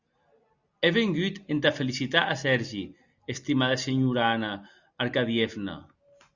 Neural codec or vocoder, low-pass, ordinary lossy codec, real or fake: none; 7.2 kHz; Opus, 64 kbps; real